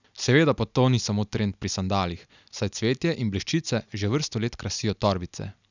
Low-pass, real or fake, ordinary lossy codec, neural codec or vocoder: 7.2 kHz; real; none; none